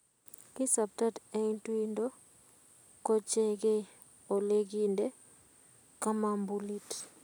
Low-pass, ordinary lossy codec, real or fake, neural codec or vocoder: none; none; real; none